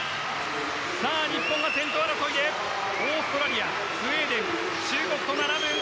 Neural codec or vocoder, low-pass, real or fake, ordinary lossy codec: none; none; real; none